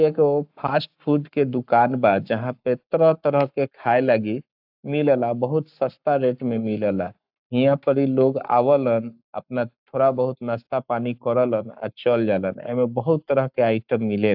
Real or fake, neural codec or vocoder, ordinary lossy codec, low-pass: fake; codec, 44.1 kHz, 7.8 kbps, Pupu-Codec; AAC, 48 kbps; 5.4 kHz